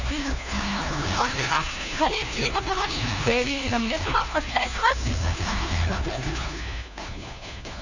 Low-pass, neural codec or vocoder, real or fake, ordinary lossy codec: 7.2 kHz; codec, 16 kHz, 1 kbps, FunCodec, trained on LibriTTS, 50 frames a second; fake; none